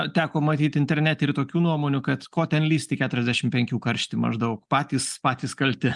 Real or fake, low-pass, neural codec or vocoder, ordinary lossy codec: real; 10.8 kHz; none; Opus, 32 kbps